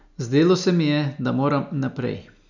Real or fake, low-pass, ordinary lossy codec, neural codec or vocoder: real; 7.2 kHz; none; none